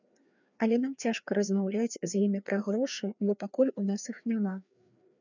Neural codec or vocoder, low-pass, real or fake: codec, 16 kHz, 2 kbps, FreqCodec, larger model; 7.2 kHz; fake